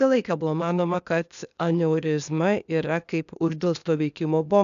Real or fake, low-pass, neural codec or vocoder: fake; 7.2 kHz; codec, 16 kHz, 0.8 kbps, ZipCodec